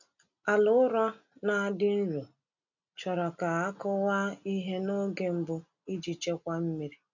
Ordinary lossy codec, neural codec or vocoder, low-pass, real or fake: none; none; 7.2 kHz; real